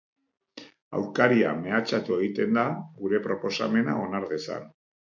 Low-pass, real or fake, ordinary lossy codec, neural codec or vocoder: 7.2 kHz; real; MP3, 64 kbps; none